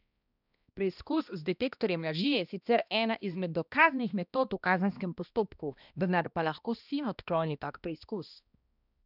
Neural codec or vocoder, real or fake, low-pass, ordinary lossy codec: codec, 16 kHz, 1 kbps, X-Codec, HuBERT features, trained on balanced general audio; fake; 5.4 kHz; none